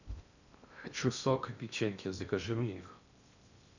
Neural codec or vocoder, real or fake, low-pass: codec, 16 kHz in and 24 kHz out, 0.6 kbps, FocalCodec, streaming, 2048 codes; fake; 7.2 kHz